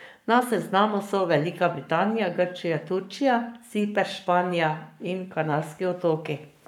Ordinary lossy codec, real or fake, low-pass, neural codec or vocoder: none; fake; 19.8 kHz; codec, 44.1 kHz, 7.8 kbps, Pupu-Codec